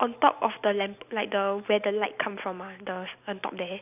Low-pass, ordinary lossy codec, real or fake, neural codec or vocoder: 3.6 kHz; none; real; none